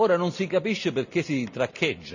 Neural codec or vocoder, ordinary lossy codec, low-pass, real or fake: none; none; 7.2 kHz; real